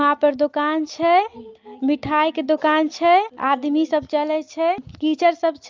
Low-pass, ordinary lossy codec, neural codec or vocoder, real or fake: 7.2 kHz; Opus, 24 kbps; none; real